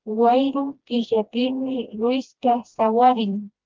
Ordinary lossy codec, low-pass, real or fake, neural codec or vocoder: Opus, 32 kbps; 7.2 kHz; fake; codec, 16 kHz, 1 kbps, FreqCodec, smaller model